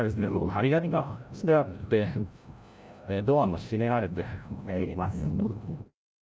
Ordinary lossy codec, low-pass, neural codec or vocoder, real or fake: none; none; codec, 16 kHz, 0.5 kbps, FreqCodec, larger model; fake